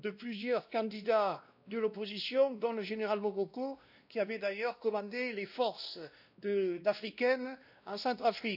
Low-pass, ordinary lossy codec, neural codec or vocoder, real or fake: 5.4 kHz; none; codec, 16 kHz, 1 kbps, X-Codec, WavLM features, trained on Multilingual LibriSpeech; fake